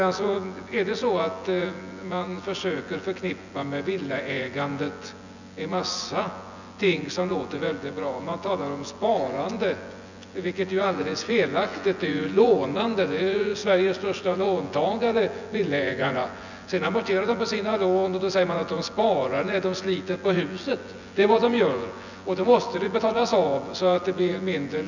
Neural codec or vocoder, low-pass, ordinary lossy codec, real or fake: vocoder, 24 kHz, 100 mel bands, Vocos; 7.2 kHz; none; fake